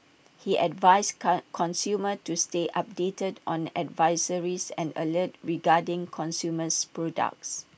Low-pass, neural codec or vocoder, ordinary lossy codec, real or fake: none; none; none; real